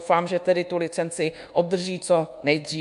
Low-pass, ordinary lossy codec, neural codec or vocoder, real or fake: 10.8 kHz; MP3, 64 kbps; codec, 24 kHz, 1.2 kbps, DualCodec; fake